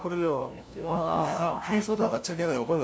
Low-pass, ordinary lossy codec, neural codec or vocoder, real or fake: none; none; codec, 16 kHz, 0.5 kbps, FunCodec, trained on LibriTTS, 25 frames a second; fake